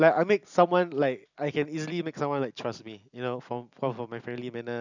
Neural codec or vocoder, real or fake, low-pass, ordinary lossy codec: none; real; 7.2 kHz; none